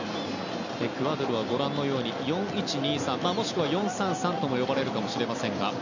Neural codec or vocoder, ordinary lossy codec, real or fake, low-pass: none; none; real; 7.2 kHz